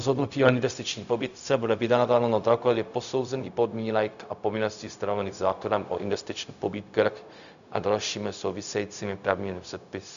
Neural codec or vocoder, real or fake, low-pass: codec, 16 kHz, 0.4 kbps, LongCat-Audio-Codec; fake; 7.2 kHz